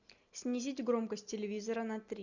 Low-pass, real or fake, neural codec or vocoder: 7.2 kHz; real; none